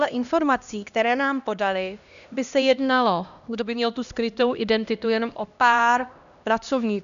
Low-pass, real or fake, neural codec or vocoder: 7.2 kHz; fake; codec, 16 kHz, 1 kbps, X-Codec, HuBERT features, trained on LibriSpeech